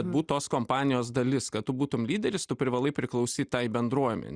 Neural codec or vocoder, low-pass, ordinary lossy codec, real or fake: none; 9.9 kHz; Opus, 64 kbps; real